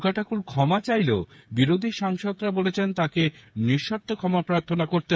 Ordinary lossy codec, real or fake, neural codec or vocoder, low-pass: none; fake; codec, 16 kHz, 8 kbps, FreqCodec, smaller model; none